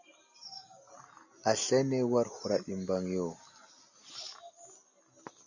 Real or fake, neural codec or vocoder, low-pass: real; none; 7.2 kHz